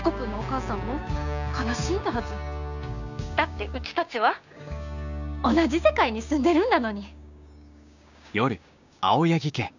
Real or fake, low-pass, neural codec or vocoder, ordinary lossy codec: fake; 7.2 kHz; codec, 16 kHz, 6 kbps, DAC; none